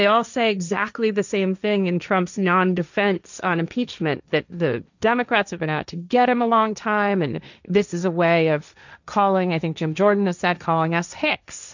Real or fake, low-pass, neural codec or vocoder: fake; 7.2 kHz; codec, 16 kHz, 1.1 kbps, Voila-Tokenizer